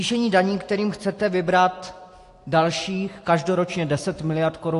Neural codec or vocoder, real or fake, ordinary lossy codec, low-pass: none; real; AAC, 48 kbps; 10.8 kHz